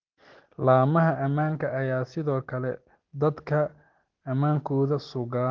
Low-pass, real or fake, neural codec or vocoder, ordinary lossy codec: 7.2 kHz; real; none; Opus, 16 kbps